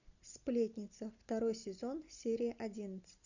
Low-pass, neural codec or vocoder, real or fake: 7.2 kHz; none; real